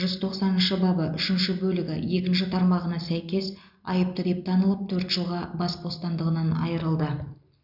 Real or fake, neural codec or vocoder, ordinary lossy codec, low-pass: real; none; none; 5.4 kHz